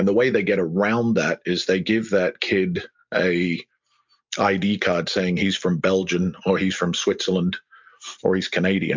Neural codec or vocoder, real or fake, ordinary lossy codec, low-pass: none; real; MP3, 64 kbps; 7.2 kHz